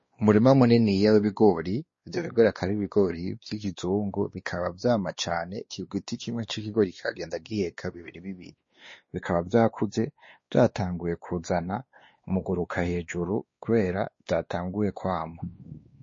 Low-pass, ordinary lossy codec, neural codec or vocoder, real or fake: 7.2 kHz; MP3, 32 kbps; codec, 16 kHz, 2 kbps, X-Codec, WavLM features, trained on Multilingual LibriSpeech; fake